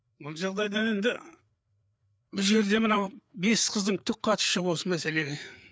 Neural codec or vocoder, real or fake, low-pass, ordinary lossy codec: codec, 16 kHz, 4 kbps, FreqCodec, larger model; fake; none; none